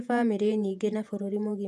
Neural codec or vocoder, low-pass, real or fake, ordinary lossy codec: vocoder, 48 kHz, 128 mel bands, Vocos; 14.4 kHz; fake; none